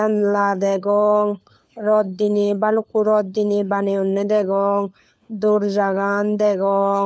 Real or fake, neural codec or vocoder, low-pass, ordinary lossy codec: fake; codec, 16 kHz, 4 kbps, FunCodec, trained on LibriTTS, 50 frames a second; none; none